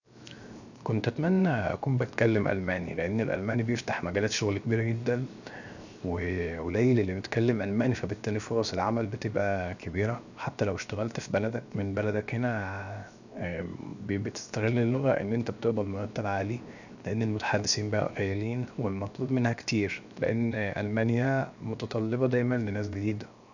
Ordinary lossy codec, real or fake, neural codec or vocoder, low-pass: none; fake; codec, 16 kHz, 0.7 kbps, FocalCodec; 7.2 kHz